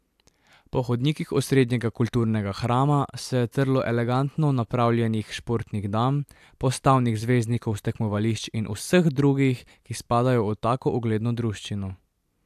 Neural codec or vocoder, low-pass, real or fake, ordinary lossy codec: vocoder, 44.1 kHz, 128 mel bands every 256 samples, BigVGAN v2; 14.4 kHz; fake; AAC, 96 kbps